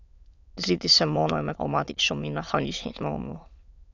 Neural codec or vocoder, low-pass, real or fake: autoencoder, 22.05 kHz, a latent of 192 numbers a frame, VITS, trained on many speakers; 7.2 kHz; fake